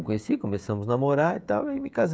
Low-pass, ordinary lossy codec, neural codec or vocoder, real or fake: none; none; codec, 16 kHz, 16 kbps, FreqCodec, smaller model; fake